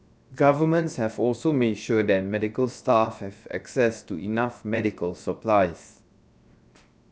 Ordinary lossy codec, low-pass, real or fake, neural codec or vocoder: none; none; fake; codec, 16 kHz, 0.7 kbps, FocalCodec